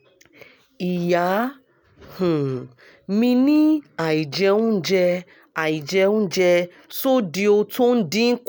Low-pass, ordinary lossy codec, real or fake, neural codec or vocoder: none; none; real; none